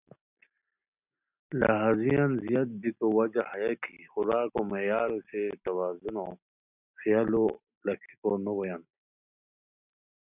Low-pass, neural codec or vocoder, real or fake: 3.6 kHz; none; real